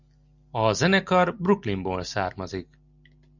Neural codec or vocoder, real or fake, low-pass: none; real; 7.2 kHz